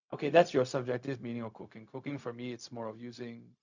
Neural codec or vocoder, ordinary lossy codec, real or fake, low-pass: codec, 16 kHz, 0.4 kbps, LongCat-Audio-Codec; none; fake; 7.2 kHz